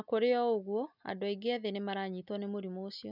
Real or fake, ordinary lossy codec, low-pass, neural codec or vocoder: real; none; 5.4 kHz; none